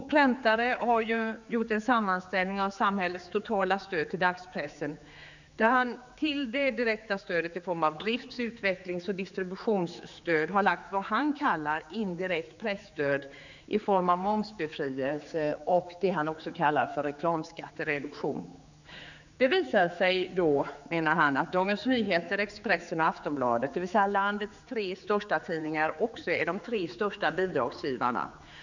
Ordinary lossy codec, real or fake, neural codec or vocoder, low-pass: none; fake; codec, 16 kHz, 4 kbps, X-Codec, HuBERT features, trained on general audio; 7.2 kHz